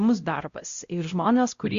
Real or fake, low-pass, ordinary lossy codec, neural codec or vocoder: fake; 7.2 kHz; Opus, 64 kbps; codec, 16 kHz, 0.5 kbps, X-Codec, WavLM features, trained on Multilingual LibriSpeech